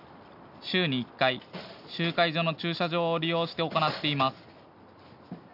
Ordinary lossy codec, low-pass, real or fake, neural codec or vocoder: none; 5.4 kHz; real; none